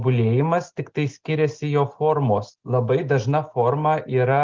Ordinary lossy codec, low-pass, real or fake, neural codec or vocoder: Opus, 16 kbps; 7.2 kHz; real; none